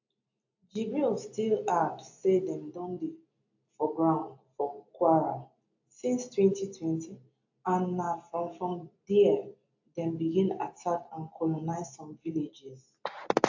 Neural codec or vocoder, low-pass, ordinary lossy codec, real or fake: none; 7.2 kHz; AAC, 48 kbps; real